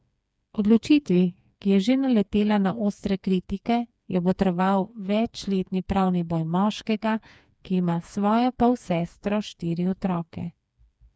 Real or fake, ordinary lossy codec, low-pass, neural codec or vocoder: fake; none; none; codec, 16 kHz, 4 kbps, FreqCodec, smaller model